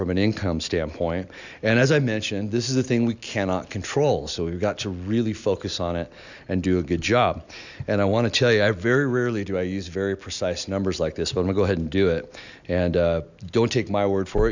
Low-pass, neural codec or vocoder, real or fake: 7.2 kHz; none; real